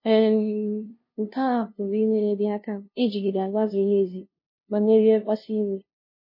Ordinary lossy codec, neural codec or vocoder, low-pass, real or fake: MP3, 24 kbps; codec, 16 kHz, 0.5 kbps, FunCodec, trained on LibriTTS, 25 frames a second; 5.4 kHz; fake